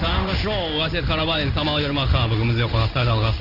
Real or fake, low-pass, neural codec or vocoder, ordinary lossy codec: fake; 5.4 kHz; codec, 16 kHz in and 24 kHz out, 1 kbps, XY-Tokenizer; none